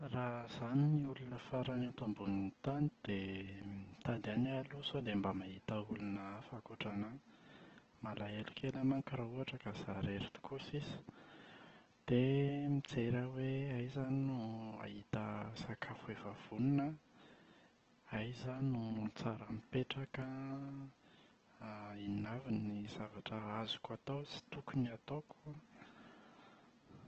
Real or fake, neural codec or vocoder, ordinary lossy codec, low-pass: real; none; Opus, 16 kbps; 7.2 kHz